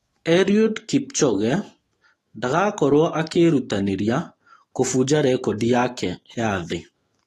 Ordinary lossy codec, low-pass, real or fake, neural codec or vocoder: AAC, 32 kbps; 19.8 kHz; fake; autoencoder, 48 kHz, 128 numbers a frame, DAC-VAE, trained on Japanese speech